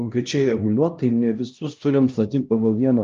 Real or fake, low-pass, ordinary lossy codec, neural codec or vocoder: fake; 7.2 kHz; Opus, 32 kbps; codec, 16 kHz, 1 kbps, X-Codec, HuBERT features, trained on LibriSpeech